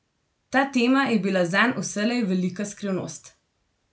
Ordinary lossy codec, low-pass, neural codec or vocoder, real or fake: none; none; none; real